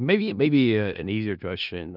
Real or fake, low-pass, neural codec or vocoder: fake; 5.4 kHz; codec, 16 kHz in and 24 kHz out, 0.4 kbps, LongCat-Audio-Codec, four codebook decoder